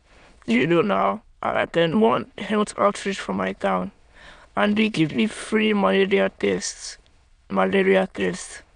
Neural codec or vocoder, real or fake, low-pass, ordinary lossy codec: autoencoder, 22.05 kHz, a latent of 192 numbers a frame, VITS, trained on many speakers; fake; 9.9 kHz; none